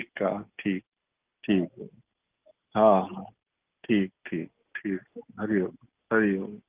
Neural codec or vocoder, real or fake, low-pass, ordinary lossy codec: none; real; 3.6 kHz; Opus, 24 kbps